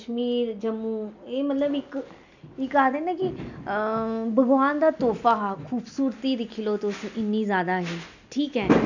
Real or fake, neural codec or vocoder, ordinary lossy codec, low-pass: real; none; none; 7.2 kHz